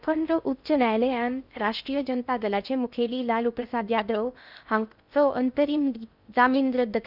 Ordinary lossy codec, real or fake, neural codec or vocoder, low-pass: none; fake; codec, 16 kHz in and 24 kHz out, 0.6 kbps, FocalCodec, streaming, 2048 codes; 5.4 kHz